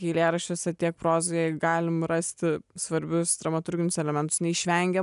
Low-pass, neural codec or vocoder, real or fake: 10.8 kHz; none; real